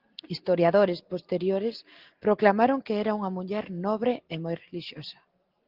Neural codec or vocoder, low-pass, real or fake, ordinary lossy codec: none; 5.4 kHz; real; Opus, 16 kbps